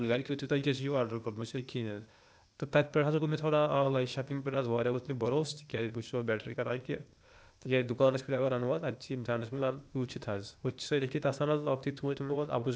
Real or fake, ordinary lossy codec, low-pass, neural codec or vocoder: fake; none; none; codec, 16 kHz, 0.8 kbps, ZipCodec